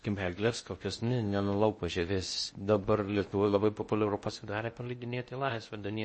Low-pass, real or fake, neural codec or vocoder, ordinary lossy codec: 10.8 kHz; fake; codec, 16 kHz in and 24 kHz out, 0.6 kbps, FocalCodec, streaming, 4096 codes; MP3, 32 kbps